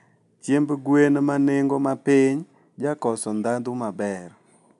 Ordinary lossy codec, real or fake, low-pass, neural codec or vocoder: none; real; 10.8 kHz; none